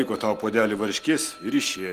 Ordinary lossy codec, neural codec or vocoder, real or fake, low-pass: Opus, 24 kbps; none; real; 14.4 kHz